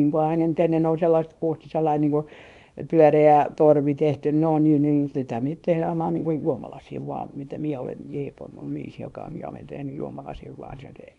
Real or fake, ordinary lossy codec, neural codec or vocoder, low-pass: fake; none; codec, 24 kHz, 0.9 kbps, WavTokenizer, small release; 10.8 kHz